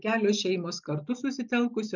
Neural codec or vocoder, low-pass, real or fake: none; 7.2 kHz; real